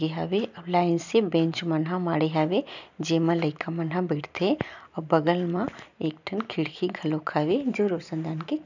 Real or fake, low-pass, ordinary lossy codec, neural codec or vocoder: real; 7.2 kHz; none; none